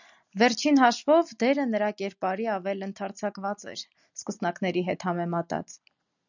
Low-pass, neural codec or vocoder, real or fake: 7.2 kHz; none; real